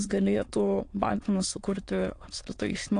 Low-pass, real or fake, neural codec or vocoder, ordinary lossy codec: 9.9 kHz; fake; autoencoder, 22.05 kHz, a latent of 192 numbers a frame, VITS, trained on many speakers; AAC, 48 kbps